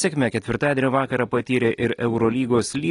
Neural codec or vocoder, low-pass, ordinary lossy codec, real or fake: none; 10.8 kHz; AAC, 32 kbps; real